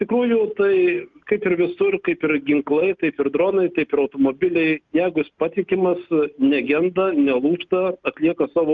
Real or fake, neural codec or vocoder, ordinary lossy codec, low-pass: real; none; Opus, 32 kbps; 7.2 kHz